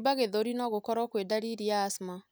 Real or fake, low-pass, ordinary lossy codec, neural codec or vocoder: real; none; none; none